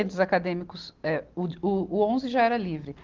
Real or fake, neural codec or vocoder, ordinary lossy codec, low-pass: real; none; Opus, 16 kbps; 7.2 kHz